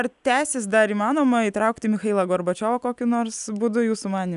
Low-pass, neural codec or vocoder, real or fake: 10.8 kHz; none; real